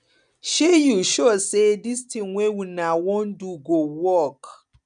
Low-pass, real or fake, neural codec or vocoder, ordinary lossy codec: 9.9 kHz; real; none; none